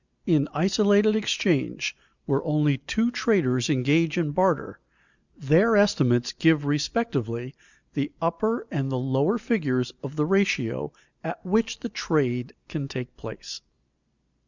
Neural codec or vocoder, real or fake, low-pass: none; real; 7.2 kHz